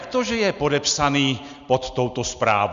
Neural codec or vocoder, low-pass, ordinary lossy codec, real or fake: none; 7.2 kHz; Opus, 64 kbps; real